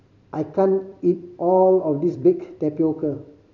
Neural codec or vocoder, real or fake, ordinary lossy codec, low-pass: vocoder, 44.1 kHz, 128 mel bands every 256 samples, BigVGAN v2; fake; none; 7.2 kHz